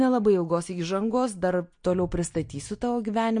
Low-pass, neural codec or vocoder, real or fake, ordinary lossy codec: 9.9 kHz; none; real; MP3, 48 kbps